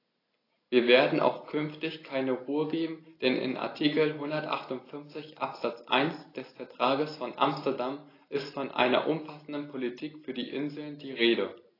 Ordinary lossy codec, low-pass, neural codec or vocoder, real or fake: AAC, 24 kbps; 5.4 kHz; none; real